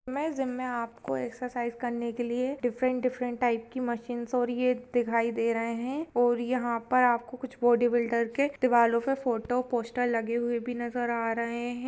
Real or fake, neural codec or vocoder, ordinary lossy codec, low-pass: real; none; none; none